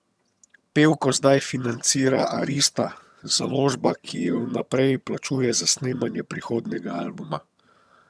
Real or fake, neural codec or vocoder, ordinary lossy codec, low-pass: fake; vocoder, 22.05 kHz, 80 mel bands, HiFi-GAN; none; none